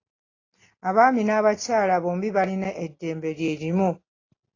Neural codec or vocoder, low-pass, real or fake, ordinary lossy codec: none; 7.2 kHz; real; AAC, 32 kbps